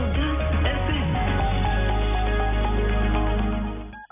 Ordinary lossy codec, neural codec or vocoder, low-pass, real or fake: none; none; 3.6 kHz; real